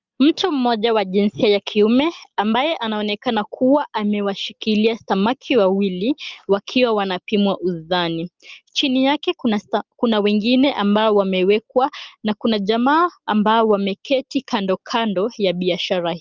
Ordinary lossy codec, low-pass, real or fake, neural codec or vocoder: Opus, 32 kbps; 7.2 kHz; real; none